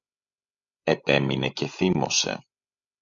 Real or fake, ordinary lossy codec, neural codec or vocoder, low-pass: fake; Opus, 64 kbps; codec, 16 kHz, 16 kbps, FreqCodec, larger model; 7.2 kHz